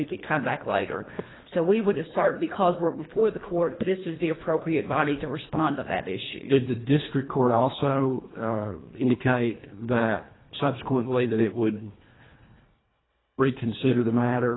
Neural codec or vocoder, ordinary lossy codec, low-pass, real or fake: codec, 24 kHz, 1.5 kbps, HILCodec; AAC, 16 kbps; 7.2 kHz; fake